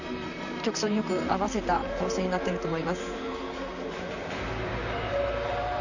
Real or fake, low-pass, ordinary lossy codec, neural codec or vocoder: fake; 7.2 kHz; none; vocoder, 44.1 kHz, 128 mel bands, Pupu-Vocoder